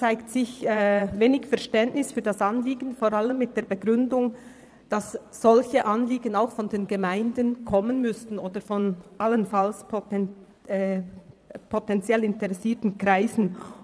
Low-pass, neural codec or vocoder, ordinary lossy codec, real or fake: none; vocoder, 22.05 kHz, 80 mel bands, Vocos; none; fake